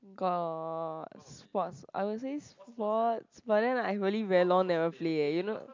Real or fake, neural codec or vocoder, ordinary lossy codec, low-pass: real; none; MP3, 64 kbps; 7.2 kHz